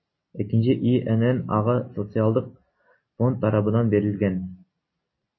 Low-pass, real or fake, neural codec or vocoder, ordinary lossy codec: 7.2 kHz; real; none; MP3, 24 kbps